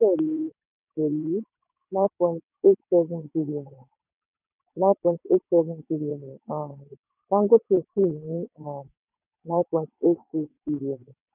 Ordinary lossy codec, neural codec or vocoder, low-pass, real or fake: none; vocoder, 22.05 kHz, 80 mel bands, WaveNeXt; 3.6 kHz; fake